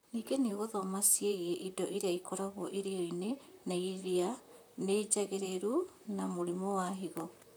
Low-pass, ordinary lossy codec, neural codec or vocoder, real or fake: none; none; vocoder, 44.1 kHz, 128 mel bands, Pupu-Vocoder; fake